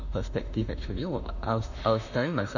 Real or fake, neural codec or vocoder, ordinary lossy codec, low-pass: fake; autoencoder, 48 kHz, 32 numbers a frame, DAC-VAE, trained on Japanese speech; none; 7.2 kHz